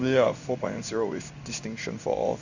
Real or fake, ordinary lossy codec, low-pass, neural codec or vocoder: fake; none; 7.2 kHz; codec, 16 kHz in and 24 kHz out, 1 kbps, XY-Tokenizer